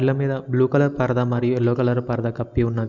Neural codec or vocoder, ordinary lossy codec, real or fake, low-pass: none; none; real; 7.2 kHz